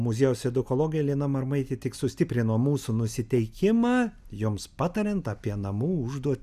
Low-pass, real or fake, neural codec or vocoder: 14.4 kHz; real; none